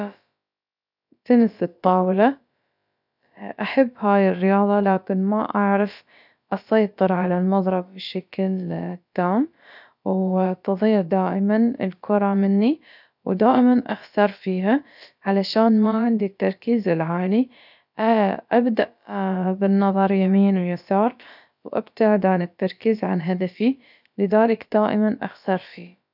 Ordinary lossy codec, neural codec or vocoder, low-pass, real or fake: none; codec, 16 kHz, about 1 kbps, DyCAST, with the encoder's durations; 5.4 kHz; fake